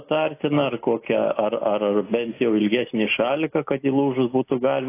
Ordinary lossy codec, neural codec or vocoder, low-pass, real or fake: AAC, 24 kbps; none; 3.6 kHz; real